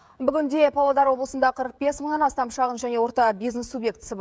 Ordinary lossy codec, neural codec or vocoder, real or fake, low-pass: none; codec, 16 kHz, 16 kbps, FreqCodec, smaller model; fake; none